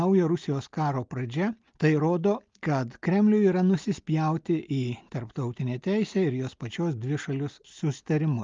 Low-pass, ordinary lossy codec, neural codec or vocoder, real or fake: 7.2 kHz; Opus, 32 kbps; none; real